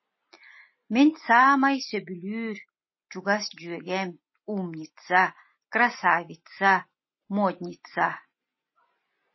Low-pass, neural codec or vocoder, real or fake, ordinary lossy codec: 7.2 kHz; none; real; MP3, 24 kbps